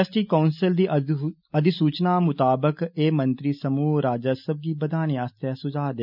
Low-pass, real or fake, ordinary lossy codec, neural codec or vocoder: 5.4 kHz; real; none; none